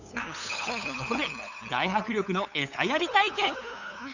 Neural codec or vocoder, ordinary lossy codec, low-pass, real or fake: codec, 16 kHz, 8 kbps, FunCodec, trained on LibriTTS, 25 frames a second; none; 7.2 kHz; fake